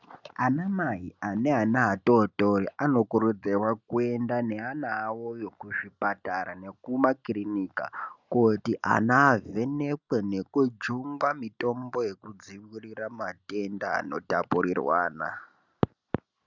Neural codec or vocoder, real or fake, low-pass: none; real; 7.2 kHz